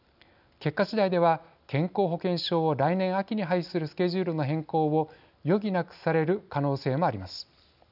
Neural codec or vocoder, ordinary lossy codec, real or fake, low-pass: none; none; real; 5.4 kHz